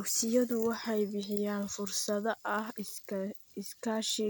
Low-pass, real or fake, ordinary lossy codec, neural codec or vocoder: none; real; none; none